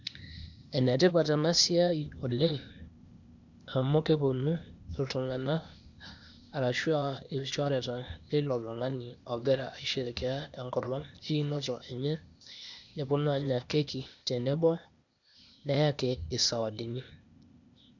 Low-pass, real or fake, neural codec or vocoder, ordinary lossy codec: 7.2 kHz; fake; codec, 16 kHz, 0.8 kbps, ZipCodec; none